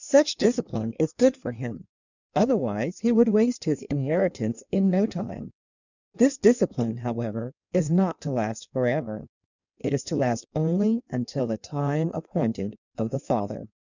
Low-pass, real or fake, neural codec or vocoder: 7.2 kHz; fake; codec, 16 kHz in and 24 kHz out, 1.1 kbps, FireRedTTS-2 codec